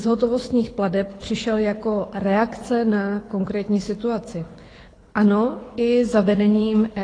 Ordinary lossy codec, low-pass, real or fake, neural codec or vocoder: AAC, 32 kbps; 9.9 kHz; fake; codec, 24 kHz, 6 kbps, HILCodec